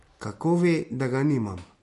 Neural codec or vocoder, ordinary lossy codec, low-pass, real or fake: none; MP3, 48 kbps; 14.4 kHz; real